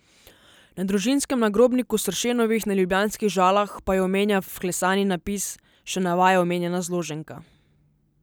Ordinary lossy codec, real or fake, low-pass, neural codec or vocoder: none; real; none; none